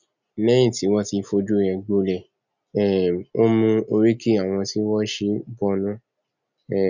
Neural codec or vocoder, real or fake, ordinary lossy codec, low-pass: none; real; none; 7.2 kHz